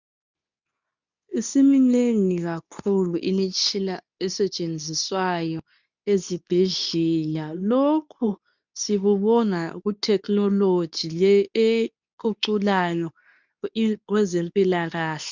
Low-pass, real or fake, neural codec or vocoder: 7.2 kHz; fake; codec, 24 kHz, 0.9 kbps, WavTokenizer, medium speech release version 2